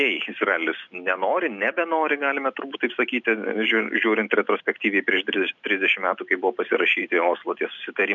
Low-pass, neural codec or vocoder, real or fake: 7.2 kHz; none; real